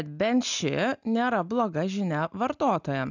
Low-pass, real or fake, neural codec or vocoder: 7.2 kHz; real; none